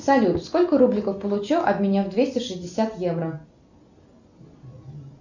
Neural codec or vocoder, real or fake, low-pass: none; real; 7.2 kHz